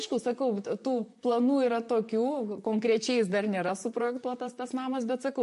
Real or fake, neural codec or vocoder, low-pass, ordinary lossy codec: fake; vocoder, 44.1 kHz, 128 mel bands, Pupu-Vocoder; 14.4 kHz; MP3, 48 kbps